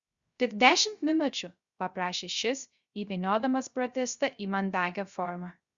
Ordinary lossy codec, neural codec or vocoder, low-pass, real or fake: Opus, 64 kbps; codec, 16 kHz, 0.3 kbps, FocalCodec; 7.2 kHz; fake